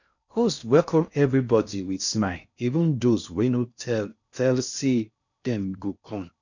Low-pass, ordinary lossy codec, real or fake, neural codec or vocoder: 7.2 kHz; none; fake; codec, 16 kHz in and 24 kHz out, 0.6 kbps, FocalCodec, streaming, 4096 codes